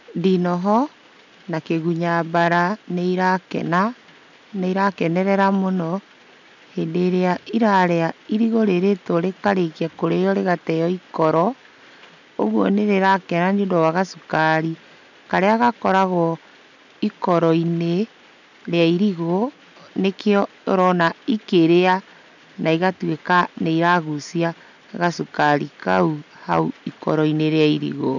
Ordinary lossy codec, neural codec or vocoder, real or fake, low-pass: none; none; real; 7.2 kHz